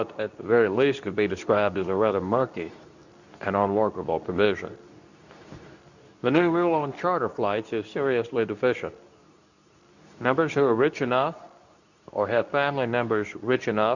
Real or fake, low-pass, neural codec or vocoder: fake; 7.2 kHz; codec, 24 kHz, 0.9 kbps, WavTokenizer, medium speech release version 2